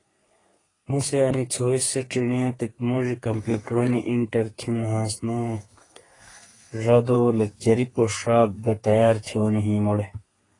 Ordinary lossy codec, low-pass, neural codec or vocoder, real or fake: AAC, 32 kbps; 10.8 kHz; codec, 32 kHz, 1.9 kbps, SNAC; fake